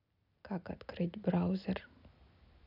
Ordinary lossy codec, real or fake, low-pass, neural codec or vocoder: none; real; 5.4 kHz; none